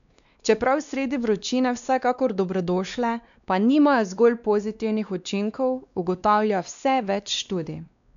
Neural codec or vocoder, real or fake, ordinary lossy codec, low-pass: codec, 16 kHz, 2 kbps, X-Codec, WavLM features, trained on Multilingual LibriSpeech; fake; none; 7.2 kHz